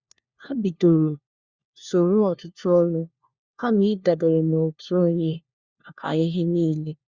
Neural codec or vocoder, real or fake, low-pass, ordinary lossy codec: codec, 16 kHz, 1 kbps, FunCodec, trained on LibriTTS, 50 frames a second; fake; 7.2 kHz; Opus, 64 kbps